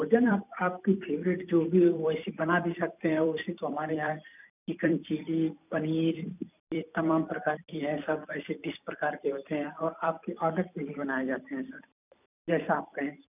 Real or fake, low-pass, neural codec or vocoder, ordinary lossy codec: fake; 3.6 kHz; vocoder, 44.1 kHz, 128 mel bands every 256 samples, BigVGAN v2; none